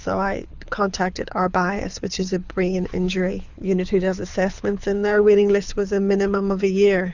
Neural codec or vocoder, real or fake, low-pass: codec, 24 kHz, 6 kbps, HILCodec; fake; 7.2 kHz